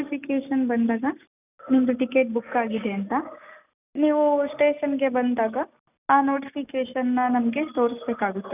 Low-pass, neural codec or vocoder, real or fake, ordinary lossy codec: 3.6 kHz; none; real; none